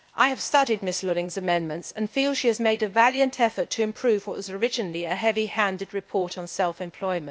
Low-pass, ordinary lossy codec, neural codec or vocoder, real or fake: none; none; codec, 16 kHz, 0.8 kbps, ZipCodec; fake